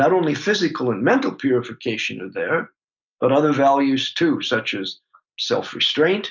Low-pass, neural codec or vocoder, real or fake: 7.2 kHz; none; real